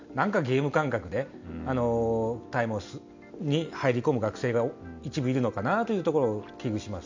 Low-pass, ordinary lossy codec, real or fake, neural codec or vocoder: 7.2 kHz; MP3, 64 kbps; real; none